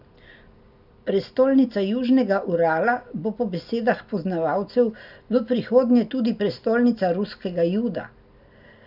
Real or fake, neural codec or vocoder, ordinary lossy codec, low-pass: real; none; none; 5.4 kHz